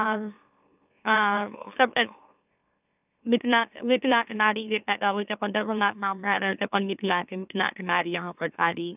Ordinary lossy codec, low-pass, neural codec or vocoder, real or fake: none; 3.6 kHz; autoencoder, 44.1 kHz, a latent of 192 numbers a frame, MeloTTS; fake